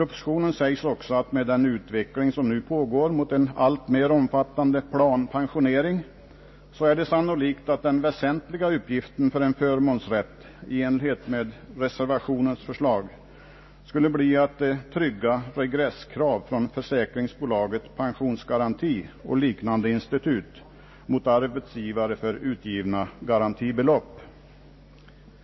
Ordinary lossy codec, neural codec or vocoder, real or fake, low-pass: MP3, 24 kbps; none; real; 7.2 kHz